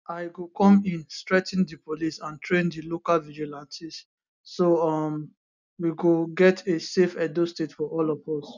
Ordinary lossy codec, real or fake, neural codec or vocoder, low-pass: none; real; none; 7.2 kHz